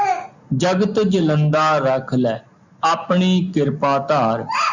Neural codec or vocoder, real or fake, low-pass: none; real; 7.2 kHz